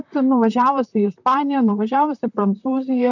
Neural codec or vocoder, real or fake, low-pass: vocoder, 44.1 kHz, 128 mel bands, Pupu-Vocoder; fake; 7.2 kHz